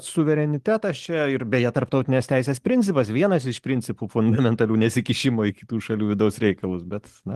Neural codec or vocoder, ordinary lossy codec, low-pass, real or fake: none; Opus, 24 kbps; 14.4 kHz; real